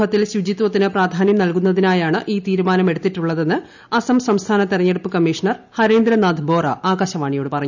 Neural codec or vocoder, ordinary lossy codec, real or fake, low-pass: none; none; real; 7.2 kHz